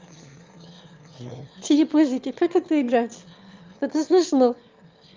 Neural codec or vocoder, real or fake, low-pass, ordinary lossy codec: autoencoder, 22.05 kHz, a latent of 192 numbers a frame, VITS, trained on one speaker; fake; 7.2 kHz; Opus, 24 kbps